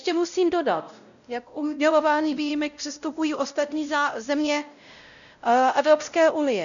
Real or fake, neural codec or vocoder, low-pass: fake; codec, 16 kHz, 0.5 kbps, X-Codec, WavLM features, trained on Multilingual LibriSpeech; 7.2 kHz